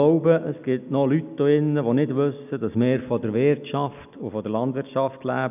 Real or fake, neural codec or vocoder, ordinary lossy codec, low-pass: real; none; none; 3.6 kHz